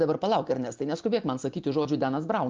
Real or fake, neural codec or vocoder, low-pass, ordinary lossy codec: real; none; 7.2 kHz; Opus, 32 kbps